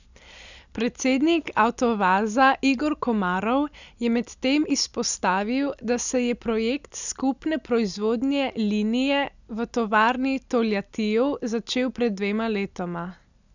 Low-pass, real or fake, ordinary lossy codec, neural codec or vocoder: 7.2 kHz; real; none; none